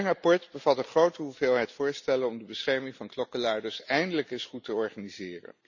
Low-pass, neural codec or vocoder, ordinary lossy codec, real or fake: 7.2 kHz; none; none; real